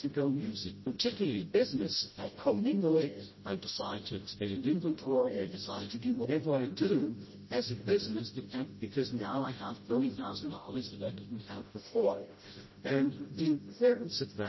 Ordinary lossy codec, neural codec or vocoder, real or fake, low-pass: MP3, 24 kbps; codec, 16 kHz, 0.5 kbps, FreqCodec, smaller model; fake; 7.2 kHz